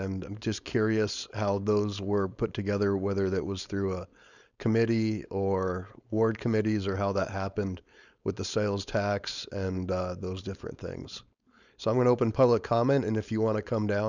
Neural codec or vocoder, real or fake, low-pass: codec, 16 kHz, 4.8 kbps, FACodec; fake; 7.2 kHz